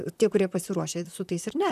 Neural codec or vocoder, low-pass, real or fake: vocoder, 44.1 kHz, 128 mel bands, Pupu-Vocoder; 14.4 kHz; fake